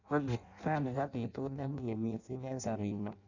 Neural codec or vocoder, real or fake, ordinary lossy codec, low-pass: codec, 16 kHz in and 24 kHz out, 0.6 kbps, FireRedTTS-2 codec; fake; none; 7.2 kHz